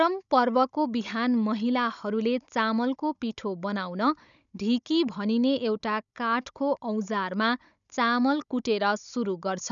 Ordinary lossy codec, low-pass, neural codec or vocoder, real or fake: none; 7.2 kHz; codec, 16 kHz, 16 kbps, FunCodec, trained on Chinese and English, 50 frames a second; fake